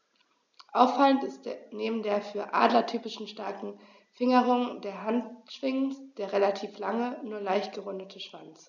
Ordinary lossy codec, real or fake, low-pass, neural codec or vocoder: none; real; 7.2 kHz; none